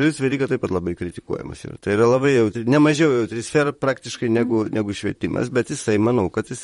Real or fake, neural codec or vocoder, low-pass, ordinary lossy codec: fake; vocoder, 44.1 kHz, 128 mel bands, Pupu-Vocoder; 19.8 kHz; MP3, 48 kbps